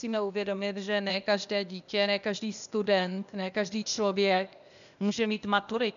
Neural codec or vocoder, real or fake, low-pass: codec, 16 kHz, 0.8 kbps, ZipCodec; fake; 7.2 kHz